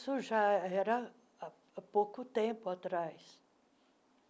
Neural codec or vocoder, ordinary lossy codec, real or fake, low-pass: none; none; real; none